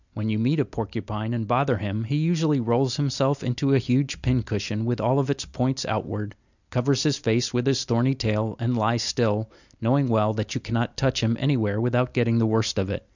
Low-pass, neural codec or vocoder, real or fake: 7.2 kHz; none; real